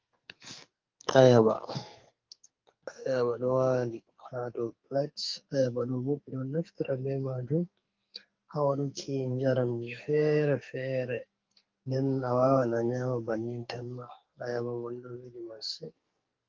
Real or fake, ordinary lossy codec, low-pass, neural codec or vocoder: fake; Opus, 32 kbps; 7.2 kHz; codec, 44.1 kHz, 2.6 kbps, SNAC